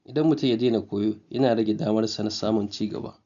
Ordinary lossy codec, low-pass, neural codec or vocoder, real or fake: none; 7.2 kHz; none; real